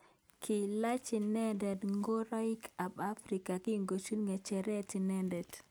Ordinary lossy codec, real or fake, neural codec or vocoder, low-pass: none; real; none; none